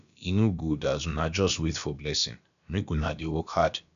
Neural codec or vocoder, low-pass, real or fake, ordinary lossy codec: codec, 16 kHz, about 1 kbps, DyCAST, with the encoder's durations; 7.2 kHz; fake; none